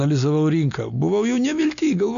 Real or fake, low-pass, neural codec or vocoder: real; 7.2 kHz; none